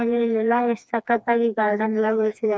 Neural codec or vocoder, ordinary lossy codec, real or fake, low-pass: codec, 16 kHz, 2 kbps, FreqCodec, smaller model; none; fake; none